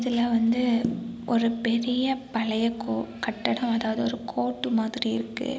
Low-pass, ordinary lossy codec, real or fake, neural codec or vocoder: none; none; real; none